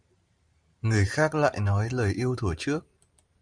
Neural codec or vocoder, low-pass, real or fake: vocoder, 44.1 kHz, 128 mel bands every 512 samples, BigVGAN v2; 9.9 kHz; fake